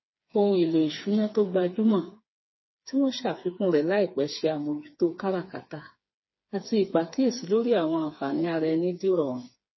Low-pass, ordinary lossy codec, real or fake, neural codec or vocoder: 7.2 kHz; MP3, 24 kbps; fake; codec, 16 kHz, 4 kbps, FreqCodec, smaller model